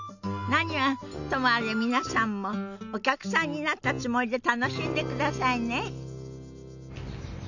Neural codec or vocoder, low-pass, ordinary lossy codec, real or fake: none; 7.2 kHz; none; real